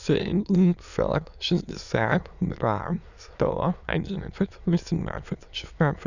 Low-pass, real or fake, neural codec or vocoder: 7.2 kHz; fake; autoencoder, 22.05 kHz, a latent of 192 numbers a frame, VITS, trained on many speakers